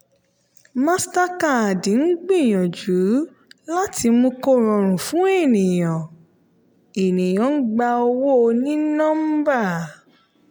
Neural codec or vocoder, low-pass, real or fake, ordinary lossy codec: none; none; real; none